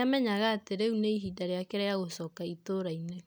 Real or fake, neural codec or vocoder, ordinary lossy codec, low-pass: real; none; none; none